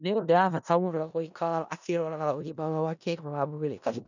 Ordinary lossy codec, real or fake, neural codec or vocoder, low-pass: none; fake; codec, 16 kHz in and 24 kHz out, 0.4 kbps, LongCat-Audio-Codec, four codebook decoder; 7.2 kHz